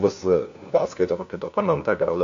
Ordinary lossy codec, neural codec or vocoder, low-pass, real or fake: AAC, 64 kbps; codec, 16 kHz, 1 kbps, FunCodec, trained on LibriTTS, 50 frames a second; 7.2 kHz; fake